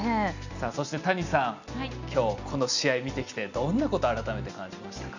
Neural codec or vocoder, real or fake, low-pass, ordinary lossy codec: none; real; 7.2 kHz; none